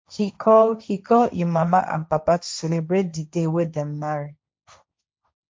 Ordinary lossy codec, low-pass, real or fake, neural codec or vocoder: none; none; fake; codec, 16 kHz, 1.1 kbps, Voila-Tokenizer